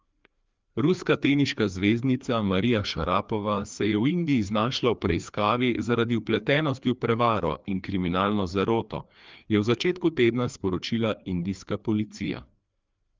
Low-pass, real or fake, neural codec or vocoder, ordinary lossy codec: 7.2 kHz; fake; codec, 16 kHz, 2 kbps, FreqCodec, larger model; Opus, 24 kbps